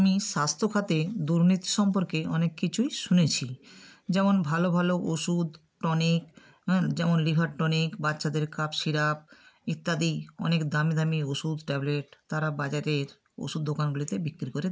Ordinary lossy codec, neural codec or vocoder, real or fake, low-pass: none; none; real; none